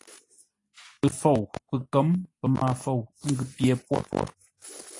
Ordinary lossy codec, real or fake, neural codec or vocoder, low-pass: AAC, 48 kbps; real; none; 10.8 kHz